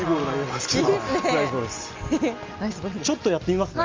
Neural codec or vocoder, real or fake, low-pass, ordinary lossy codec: none; real; 7.2 kHz; Opus, 32 kbps